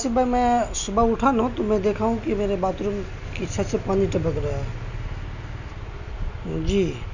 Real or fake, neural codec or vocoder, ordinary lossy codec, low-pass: real; none; none; 7.2 kHz